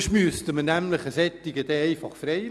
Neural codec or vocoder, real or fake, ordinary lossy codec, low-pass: none; real; none; none